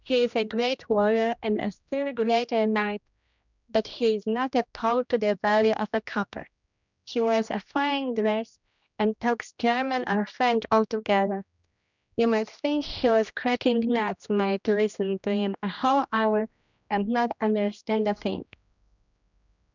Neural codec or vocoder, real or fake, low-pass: codec, 16 kHz, 1 kbps, X-Codec, HuBERT features, trained on general audio; fake; 7.2 kHz